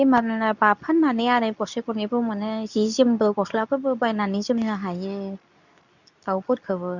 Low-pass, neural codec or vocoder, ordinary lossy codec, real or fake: 7.2 kHz; codec, 24 kHz, 0.9 kbps, WavTokenizer, medium speech release version 2; none; fake